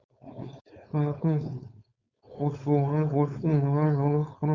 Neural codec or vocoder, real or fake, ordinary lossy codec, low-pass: codec, 16 kHz, 4.8 kbps, FACodec; fake; Opus, 64 kbps; 7.2 kHz